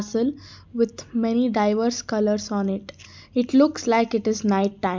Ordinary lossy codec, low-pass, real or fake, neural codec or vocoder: MP3, 64 kbps; 7.2 kHz; real; none